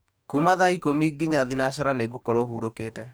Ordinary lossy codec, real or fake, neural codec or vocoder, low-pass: none; fake; codec, 44.1 kHz, 2.6 kbps, DAC; none